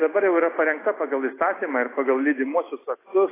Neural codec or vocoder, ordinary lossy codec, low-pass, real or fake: none; AAC, 16 kbps; 3.6 kHz; real